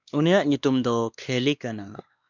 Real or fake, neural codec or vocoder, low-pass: fake; codec, 16 kHz, 2 kbps, X-Codec, WavLM features, trained on Multilingual LibriSpeech; 7.2 kHz